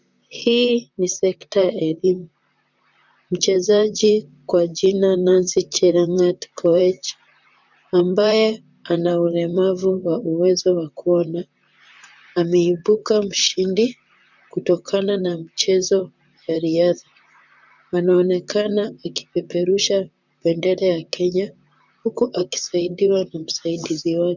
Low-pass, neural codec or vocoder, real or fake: 7.2 kHz; vocoder, 44.1 kHz, 128 mel bands, Pupu-Vocoder; fake